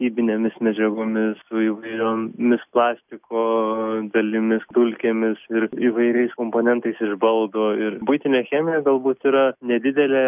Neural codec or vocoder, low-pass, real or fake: none; 3.6 kHz; real